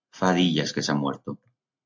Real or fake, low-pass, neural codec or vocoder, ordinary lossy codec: real; 7.2 kHz; none; MP3, 64 kbps